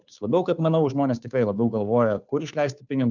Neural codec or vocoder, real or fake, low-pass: codec, 16 kHz, 2 kbps, FunCodec, trained on Chinese and English, 25 frames a second; fake; 7.2 kHz